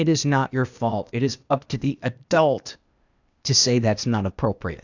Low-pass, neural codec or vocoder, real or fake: 7.2 kHz; codec, 16 kHz, 0.8 kbps, ZipCodec; fake